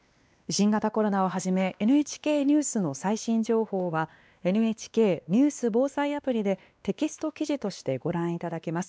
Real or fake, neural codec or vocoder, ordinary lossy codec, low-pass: fake; codec, 16 kHz, 2 kbps, X-Codec, WavLM features, trained on Multilingual LibriSpeech; none; none